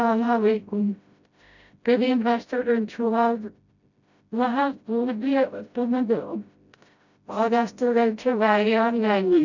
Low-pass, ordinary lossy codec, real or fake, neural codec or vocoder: 7.2 kHz; none; fake; codec, 16 kHz, 0.5 kbps, FreqCodec, smaller model